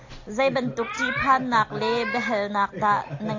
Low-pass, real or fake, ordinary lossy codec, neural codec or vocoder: 7.2 kHz; real; AAC, 48 kbps; none